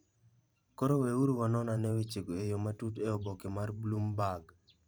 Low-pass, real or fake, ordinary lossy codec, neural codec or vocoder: none; fake; none; vocoder, 44.1 kHz, 128 mel bands every 512 samples, BigVGAN v2